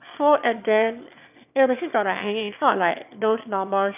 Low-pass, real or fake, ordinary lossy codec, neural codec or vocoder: 3.6 kHz; fake; none; autoencoder, 22.05 kHz, a latent of 192 numbers a frame, VITS, trained on one speaker